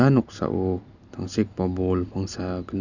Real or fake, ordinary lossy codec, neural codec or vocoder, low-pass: real; none; none; 7.2 kHz